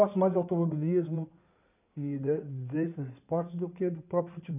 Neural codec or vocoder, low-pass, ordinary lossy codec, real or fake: codec, 16 kHz in and 24 kHz out, 2.2 kbps, FireRedTTS-2 codec; 3.6 kHz; none; fake